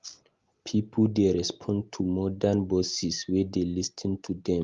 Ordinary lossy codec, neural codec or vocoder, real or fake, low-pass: Opus, 32 kbps; none; real; 7.2 kHz